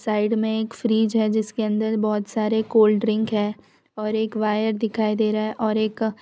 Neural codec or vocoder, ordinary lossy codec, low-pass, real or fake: none; none; none; real